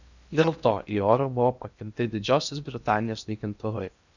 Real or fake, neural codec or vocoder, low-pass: fake; codec, 16 kHz in and 24 kHz out, 0.8 kbps, FocalCodec, streaming, 65536 codes; 7.2 kHz